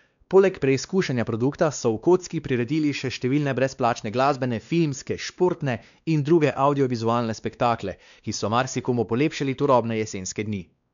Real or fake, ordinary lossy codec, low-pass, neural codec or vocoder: fake; none; 7.2 kHz; codec, 16 kHz, 2 kbps, X-Codec, WavLM features, trained on Multilingual LibriSpeech